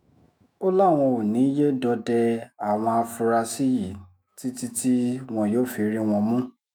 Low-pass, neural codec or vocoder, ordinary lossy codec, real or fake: none; autoencoder, 48 kHz, 128 numbers a frame, DAC-VAE, trained on Japanese speech; none; fake